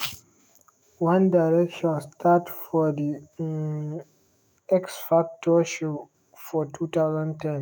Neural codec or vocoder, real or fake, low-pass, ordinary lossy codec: autoencoder, 48 kHz, 128 numbers a frame, DAC-VAE, trained on Japanese speech; fake; none; none